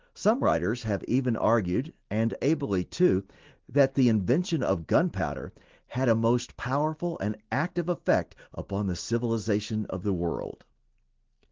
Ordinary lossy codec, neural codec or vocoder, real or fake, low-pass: Opus, 16 kbps; none; real; 7.2 kHz